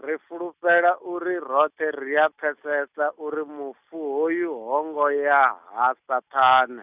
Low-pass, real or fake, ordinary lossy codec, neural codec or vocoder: 3.6 kHz; real; Opus, 64 kbps; none